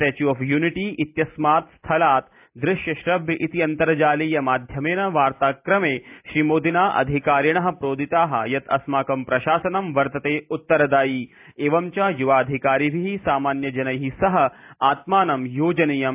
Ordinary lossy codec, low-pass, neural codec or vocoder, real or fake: AAC, 32 kbps; 3.6 kHz; none; real